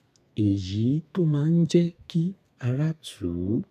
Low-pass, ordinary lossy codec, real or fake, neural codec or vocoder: 14.4 kHz; none; fake; codec, 32 kHz, 1.9 kbps, SNAC